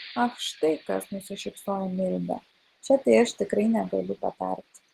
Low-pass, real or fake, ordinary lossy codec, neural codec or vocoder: 14.4 kHz; real; Opus, 16 kbps; none